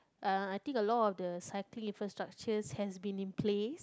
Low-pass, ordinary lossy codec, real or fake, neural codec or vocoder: none; none; real; none